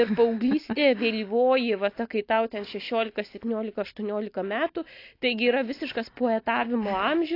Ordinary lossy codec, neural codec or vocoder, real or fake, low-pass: AAC, 32 kbps; none; real; 5.4 kHz